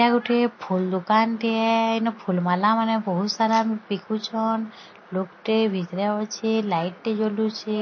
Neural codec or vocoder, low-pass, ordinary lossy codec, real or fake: none; 7.2 kHz; MP3, 32 kbps; real